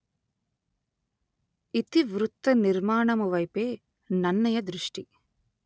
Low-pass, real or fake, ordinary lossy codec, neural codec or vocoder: none; real; none; none